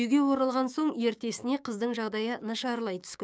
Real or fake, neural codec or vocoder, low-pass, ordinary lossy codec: fake; codec, 16 kHz, 6 kbps, DAC; none; none